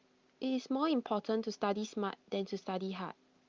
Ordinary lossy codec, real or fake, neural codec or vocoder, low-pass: Opus, 32 kbps; real; none; 7.2 kHz